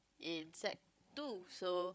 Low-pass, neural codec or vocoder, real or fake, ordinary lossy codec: none; codec, 16 kHz, 16 kbps, FreqCodec, larger model; fake; none